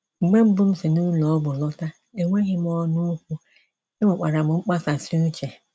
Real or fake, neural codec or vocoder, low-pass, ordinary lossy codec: real; none; none; none